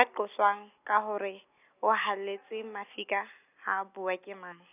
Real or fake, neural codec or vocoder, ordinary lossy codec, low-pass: real; none; none; 3.6 kHz